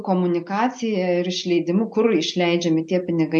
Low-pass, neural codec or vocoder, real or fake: 10.8 kHz; none; real